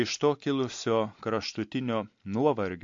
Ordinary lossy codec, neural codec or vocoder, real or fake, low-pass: MP3, 48 kbps; none; real; 7.2 kHz